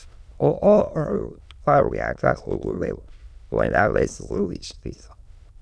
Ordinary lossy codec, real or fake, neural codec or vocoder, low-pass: none; fake; autoencoder, 22.05 kHz, a latent of 192 numbers a frame, VITS, trained on many speakers; none